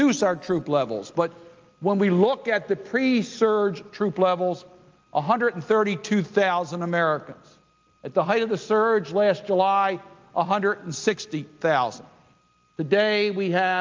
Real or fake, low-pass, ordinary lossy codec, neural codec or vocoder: real; 7.2 kHz; Opus, 24 kbps; none